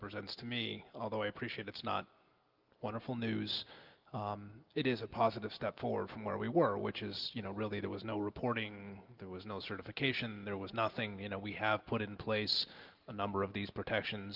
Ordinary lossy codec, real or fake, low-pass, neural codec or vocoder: Opus, 32 kbps; real; 5.4 kHz; none